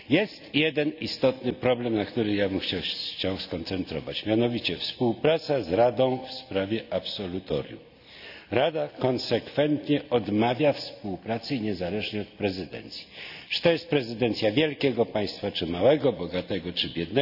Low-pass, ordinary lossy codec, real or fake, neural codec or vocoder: 5.4 kHz; none; real; none